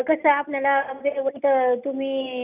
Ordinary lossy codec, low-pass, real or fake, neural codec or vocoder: none; 3.6 kHz; real; none